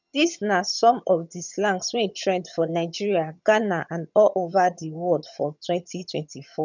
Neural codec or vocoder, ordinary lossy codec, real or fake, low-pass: vocoder, 22.05 kHz, 80 mel bands, HiFi-GAN; none; fake; 7.2 kHz